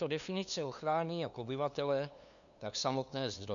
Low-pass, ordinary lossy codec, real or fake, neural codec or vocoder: 7.2 kHz; MP3, 96 kbps; fake; codec, 16 kHz, 2 kbps, FunCodec, trained on LibriTTS, 25 frames a second